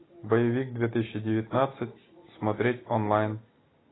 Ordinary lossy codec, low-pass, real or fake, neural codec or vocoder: AAC, 16 kbps; 7.2 kHz; real; none